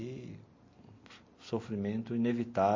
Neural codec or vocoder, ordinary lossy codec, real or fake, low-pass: none; MP3, 32 kbps; real; 7.2 kHz